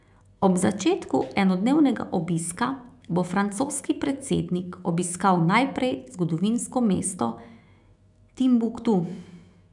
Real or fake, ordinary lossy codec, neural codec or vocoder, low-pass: fake; none; autoencoder, 48 kHz, 128 numbers a frame, DAC-VAE, trained on Japanese speech; 10.8 kHz